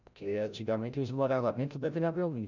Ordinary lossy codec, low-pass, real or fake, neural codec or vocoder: none; 7.2 kHz; fake; codec, 16 kHz, 0.5 kbps, FreqCodec, larger model